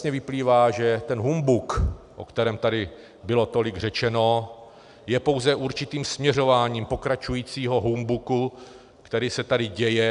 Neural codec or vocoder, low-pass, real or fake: none; 10.8 kHz; real